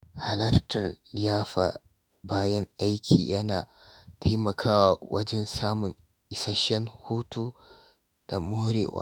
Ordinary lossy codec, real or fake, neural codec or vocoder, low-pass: none; fake; autoencoder, 48 kHz, 32 numbers a frame, DAC-VAE, trained on Japanese speech; none